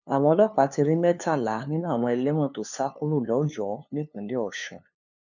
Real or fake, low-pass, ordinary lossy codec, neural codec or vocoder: fake; 7.2 kHz; none; codec, 16 kHz, 2 kbps, FunCodec, trained on LibriTTS, 25 frames a second